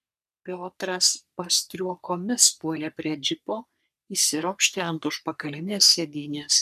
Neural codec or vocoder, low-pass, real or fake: codec, 44.1 kHz, 3.4 kbps, Pupu-Codec; 14.4 kHz; fake